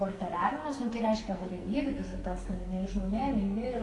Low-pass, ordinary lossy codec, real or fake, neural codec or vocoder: 10.8 kHz; AAC, 48 kbps; fake; codec, 44.1 kHz, 2.6 kbps, SNAC